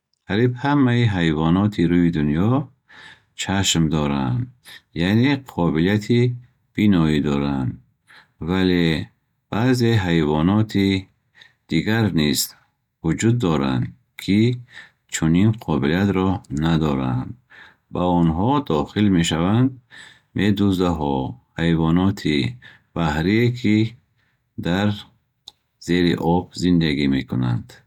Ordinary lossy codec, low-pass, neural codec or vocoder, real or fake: none; 19.8 kHz; none; real